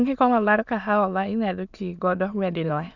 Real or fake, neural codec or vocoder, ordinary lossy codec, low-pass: fake; autoencoder, 22.05 kHz, a latent of 192 numbers a frame, VITS, trained on many speakers; none; 7.2 kHz